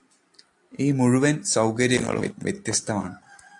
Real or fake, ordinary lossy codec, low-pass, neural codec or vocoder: real; AAC, 64 kbps; 10.8 kHz; none